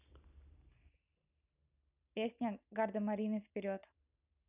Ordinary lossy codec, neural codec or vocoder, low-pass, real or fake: none; none; 3.6 kHz; real